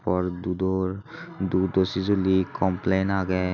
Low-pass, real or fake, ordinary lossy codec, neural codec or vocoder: 7.2 kHz; real; none; none